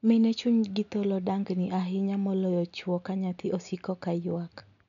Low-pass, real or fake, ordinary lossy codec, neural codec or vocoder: 7.2 kHz; real; none; none